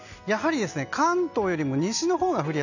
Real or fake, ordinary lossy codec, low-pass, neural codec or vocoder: real; none; 7.2 kHz; none